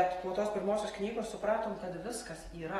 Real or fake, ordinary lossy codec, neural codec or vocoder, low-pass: real; AAC, 32 kbps; none; 19.8 kHz